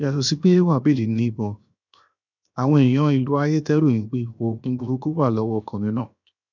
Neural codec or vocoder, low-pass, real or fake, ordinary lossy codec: codec, 16 kHz, 0.7 kbps, FocalCodec; 7.2 kHz; fake; none